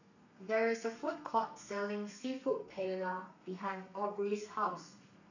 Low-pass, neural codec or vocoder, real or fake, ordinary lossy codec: 7.2 kHz; codec, 32 kHz, 1.9 kbps, SNAC; fake; none